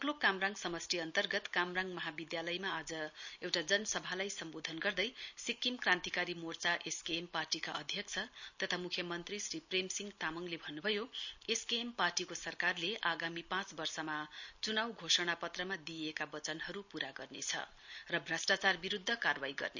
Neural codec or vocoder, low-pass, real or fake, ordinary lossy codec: none; 7.2 kHz; real; none